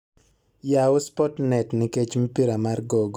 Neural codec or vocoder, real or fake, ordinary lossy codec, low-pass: none; real; none; 19.8 kHz